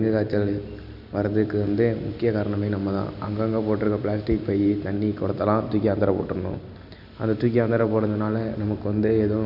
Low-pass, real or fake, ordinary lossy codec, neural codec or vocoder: 5.4 kHz; real; none; none